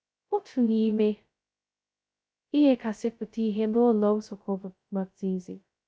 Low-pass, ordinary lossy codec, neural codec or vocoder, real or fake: none; none; codec, 16 kHz, 0.2 kbps, FocalCodec; fake